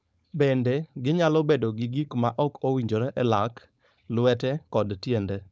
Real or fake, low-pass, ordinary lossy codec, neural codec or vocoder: fake; none; none; codec, 16 kHz, 4.8 kbps, FACodec